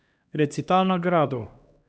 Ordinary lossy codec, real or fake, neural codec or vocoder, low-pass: none; fake; codec, 16 kHz, 1 kbps, X-Codec, HuBERT features, trained on LibriSpeech; none